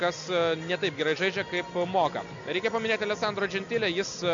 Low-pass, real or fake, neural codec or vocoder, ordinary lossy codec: 7.2 kHz; real; none; MP3, 64 kbps